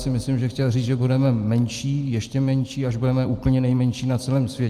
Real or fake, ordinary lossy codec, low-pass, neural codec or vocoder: real; Opus, 32 kbps; 14.4 kHz; none